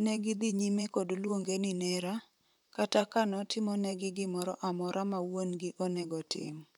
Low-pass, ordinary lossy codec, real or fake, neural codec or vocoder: 19.8 kHz; none; fake; vocoder, 44.1 kHz, 128 mel bands, Pupu-Vocoder